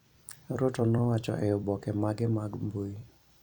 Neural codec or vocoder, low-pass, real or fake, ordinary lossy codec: vocoder, 44.1 kHz, 128 mel bands every 256 samples, BigVGAN v2; 19.8 kHz; fake; none